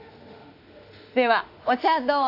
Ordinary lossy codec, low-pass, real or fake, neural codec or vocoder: AAC, 32 kbps; 5.4 kHz; fake; autoencoder, 48 kHz, 32 numbers a frame, DAC-VAE, trained on Japanese speech